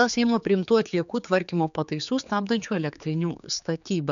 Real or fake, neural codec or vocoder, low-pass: fake; codec, 16 kHz, 4 kbps, X-Codec, HuBERT features, trained on general audio; 7.2 kHz